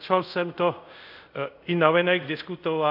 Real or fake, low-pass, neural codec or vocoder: fake; 5.4 kHz; codec, 24 kHz, 0.5 kbps, DualCodec